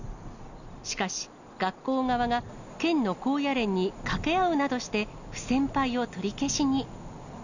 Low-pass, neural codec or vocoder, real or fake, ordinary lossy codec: 7.2 kHz; none; real; none